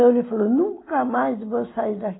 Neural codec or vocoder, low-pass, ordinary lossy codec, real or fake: none; 7.2 kHz; AAC, 16 kbps; real